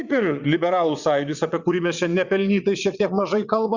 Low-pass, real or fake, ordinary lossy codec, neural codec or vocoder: 7.2 kHz; fake; Opus, 64 kbps; vocoder, 22.05 kHz, 80 mel bands, WaveNeXt